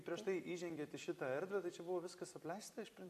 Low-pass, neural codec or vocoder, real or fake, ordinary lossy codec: 14.4 kHz; vocoder, 44.1 kHz, 128 mel bands every 256 samples, BigVGAN v2; fake; AAC, 64 kbps